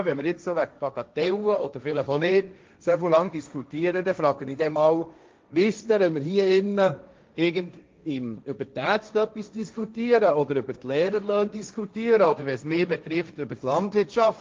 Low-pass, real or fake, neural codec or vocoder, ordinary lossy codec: 7.2 kHz; fake; codec, 16 kHz, 1.1 kbps, Voila-Tokenizer; Opus, 24 kbps